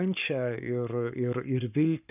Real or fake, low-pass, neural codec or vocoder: fake; 3.6 kHz; codec, 44.1 kHz, 7.8 kbps, Pupu-Codec